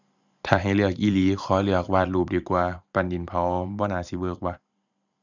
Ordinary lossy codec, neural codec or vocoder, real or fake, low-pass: none; vocoder, 44.1 kHz, 128 mel bands every 512 samples, BigVGAN v2; fake; 7.2 kHz